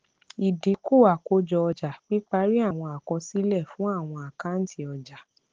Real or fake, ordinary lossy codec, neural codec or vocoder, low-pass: real; Opus, 16 kbps; none; 7.2 kHz